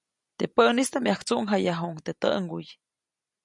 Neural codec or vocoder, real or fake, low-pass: none; real; 10.8 kHz